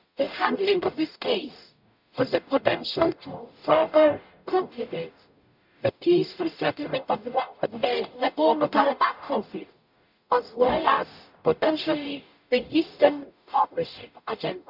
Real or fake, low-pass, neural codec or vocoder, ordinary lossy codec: fake; 5.4 kHz; codec, 44.1 kHz, 0.9 kbps, DAC; none